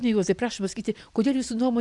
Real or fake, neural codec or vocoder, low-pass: real; none; 10.8 kHz